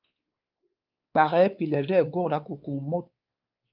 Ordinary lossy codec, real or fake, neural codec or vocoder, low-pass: Opus, 24 kbps; fake; codec, 16 kHz, 4 kbps, X-Codec, WavLM features, trained on Multilingual LibriSpeech; 5.4 kHz